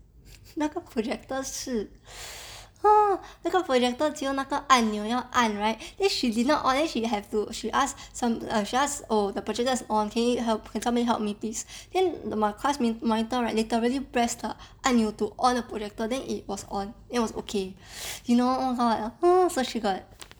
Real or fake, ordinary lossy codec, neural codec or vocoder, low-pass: real; none; none; none